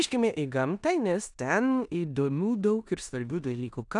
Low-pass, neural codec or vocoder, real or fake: 10.8 kHz; codec, 16 kHz in and 24 kHz out, 0.9 kbps, LongCat-Audio-Codec, four codebook decoder; fake